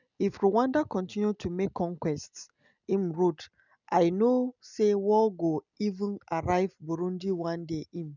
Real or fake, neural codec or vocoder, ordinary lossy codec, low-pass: real; none; none; 7.2 kHz